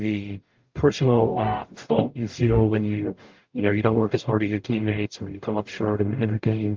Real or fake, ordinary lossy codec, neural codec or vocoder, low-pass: fake; Opus, 32 kbps; codec, 44.1 kHz, 0.9 kbps, DAC; 7.2 kHz